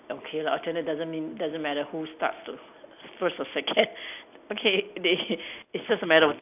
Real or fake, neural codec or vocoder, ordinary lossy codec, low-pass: real; none; AAC, 32 kbps; 3.6 kHz